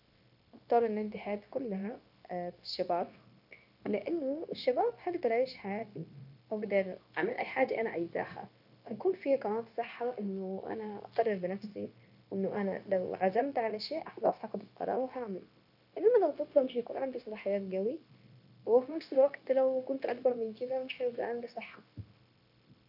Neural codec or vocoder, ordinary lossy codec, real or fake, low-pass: codec, 16 kHz, 0.9 kbps, LongCat-Audio-Codec; none; fake; 5.4 kHz